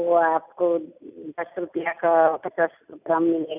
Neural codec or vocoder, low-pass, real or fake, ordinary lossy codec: none; 3.6 kHz; real; none